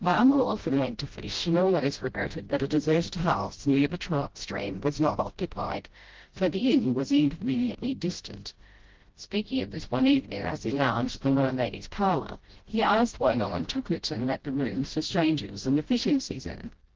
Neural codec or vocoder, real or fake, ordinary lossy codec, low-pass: codec, 16 kHz, 0.5 kbps, FreqCodec, smaller model; fake; Opus, 16 kbps; 7.2 kHz